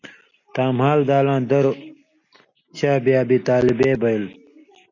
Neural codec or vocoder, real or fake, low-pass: none; real; 7.2 kHz